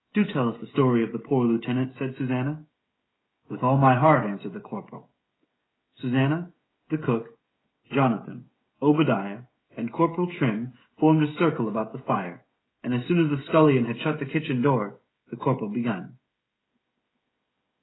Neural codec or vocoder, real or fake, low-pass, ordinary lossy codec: codec, 16 kHz, 16 kbps, FreqCodec, smaller model; fake; 7.2 kHz; AAC, 16 kbps